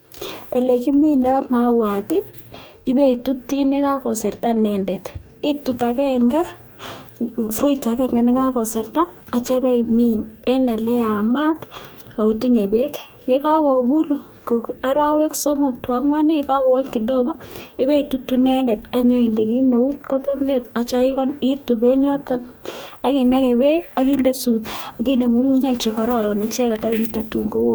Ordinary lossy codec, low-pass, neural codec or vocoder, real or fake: none; none; codec, 44.1 kHz, 2.6 kbps, DAC; fake